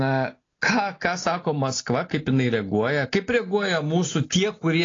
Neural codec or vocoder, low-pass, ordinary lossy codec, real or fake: none; 7.2 kHz; AAC, 32 kbps; real